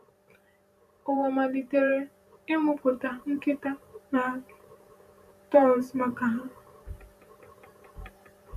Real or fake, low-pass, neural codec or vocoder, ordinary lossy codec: fake; 14.4 kHz; vocoder, 44.1 kHz, 128 mel bands every 512 samples, BigVGAN v2; none